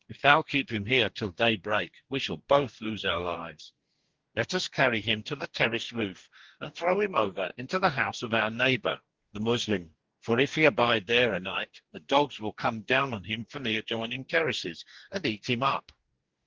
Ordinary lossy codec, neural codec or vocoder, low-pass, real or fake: Opus, 16 kbps; codec, 44.1 kHz, 2.6 kbps, DAC; 7.2 kHz; fake